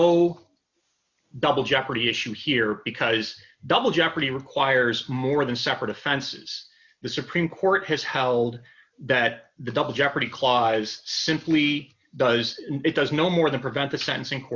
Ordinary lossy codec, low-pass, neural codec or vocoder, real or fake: Opus, 64 kbps; 7.2 kHz; none; real